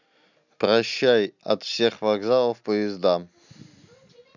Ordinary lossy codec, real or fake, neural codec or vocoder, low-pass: none; real; none; 7.2 kHz